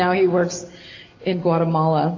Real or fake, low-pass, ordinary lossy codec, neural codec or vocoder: real; 7.2 kHz; AAC, 32 kbps; none